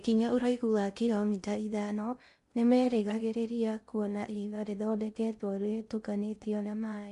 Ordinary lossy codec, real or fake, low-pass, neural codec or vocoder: MP3, 64 kbps; fake; 10.8 kHz; codec, 16 kHz in and 24 kHz out, 0.6 kbps, FocalCodec, streaming, 2048 codes